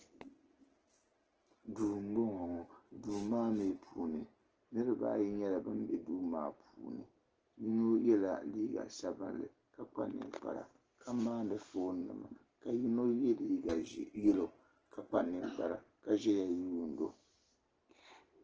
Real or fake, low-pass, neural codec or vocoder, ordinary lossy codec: real; 7.2 kHz; none; Opus, 16 kbps